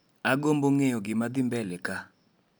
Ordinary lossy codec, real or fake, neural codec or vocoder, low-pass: none; real; none; none